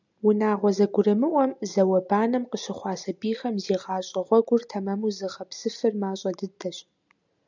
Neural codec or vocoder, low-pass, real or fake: none; 7.2 kHz; real